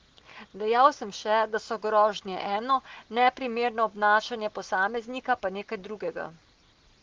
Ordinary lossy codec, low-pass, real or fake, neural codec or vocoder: Opus, 16 kbps; 7.2 kHz; real; none